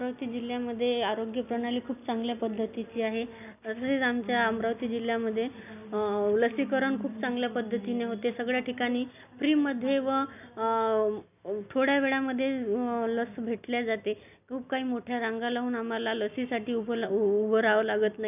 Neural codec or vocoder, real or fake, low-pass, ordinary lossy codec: none; real; 3.6 kHz; none